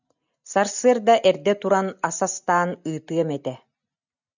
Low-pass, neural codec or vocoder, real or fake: 7.2 kHz; none; real